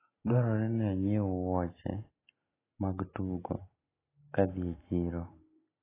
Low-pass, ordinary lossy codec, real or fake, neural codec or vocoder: 3.6 kHz; AAC, 16 kbps; real; none